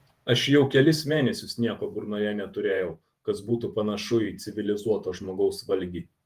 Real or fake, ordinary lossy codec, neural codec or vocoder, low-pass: fake; Opus, 24 kbps; vocoder, 48 kHz, 128 mel bands, Vocos; 14.4 kHz